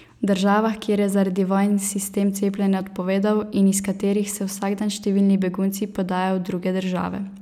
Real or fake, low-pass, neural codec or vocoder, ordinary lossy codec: real; 19.8 kHz; none; none